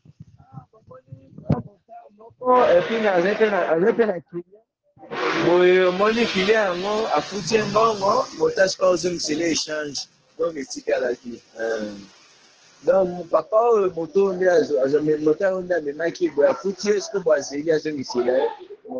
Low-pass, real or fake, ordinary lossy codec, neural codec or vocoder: 7.2 kHz; fake; Opus, 16 kbps; codec, 44.1 kHz, 2.6 kbps, SNAC